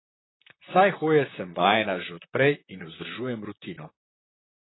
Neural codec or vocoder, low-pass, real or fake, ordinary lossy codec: vocoder, 44.1 kHz, 128 mel bands, Pupu-Vocoder; 7.2 kHz; fake; AAC, 16 kbps